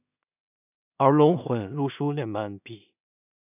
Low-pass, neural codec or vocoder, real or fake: 3.6 kHz; codec, 16 kHz in and 24 kHz out, 0.4 kbps, LongCat-Audio-Codec, two codebook decoder; fake